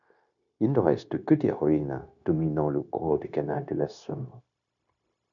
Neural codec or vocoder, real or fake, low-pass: codec, 16 kHz, 0.9 kbps, LongCat-Audio-Codec; fake; 7.2 kHz